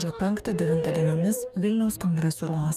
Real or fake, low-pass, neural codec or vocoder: fake; 14.4 kHz; codec, 44.1 kHz, 2.6 kbps, DAC